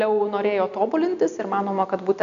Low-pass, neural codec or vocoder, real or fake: 7.2 kHz; none; real